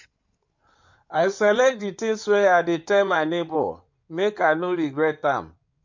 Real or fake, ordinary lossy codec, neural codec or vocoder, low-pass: fake; MP3, 48 kbps; codec, 16 kHz in and 24 kHz out, 2.2 kbps, FireRedTTS-2 codec; 7.2 kHz